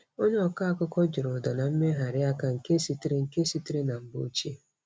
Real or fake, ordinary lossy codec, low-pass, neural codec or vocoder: real; none; none; none